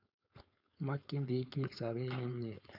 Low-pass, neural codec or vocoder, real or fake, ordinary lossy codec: 5.4 kHz; codec, 16 kHz, 4.8 kbps, FACodec; fake; none